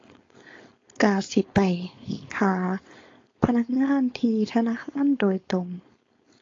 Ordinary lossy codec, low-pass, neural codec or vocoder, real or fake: AAC, 32 kbps; 7.2 kHz; codec, 16 kHz, 4.8 kbps, FACodec; fake